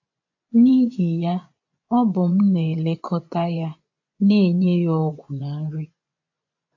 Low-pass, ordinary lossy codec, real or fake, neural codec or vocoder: 7.2 kHz; AAC, 48 kbps; fake; vocoder, 24 kHz, 100 mel bands, Vocos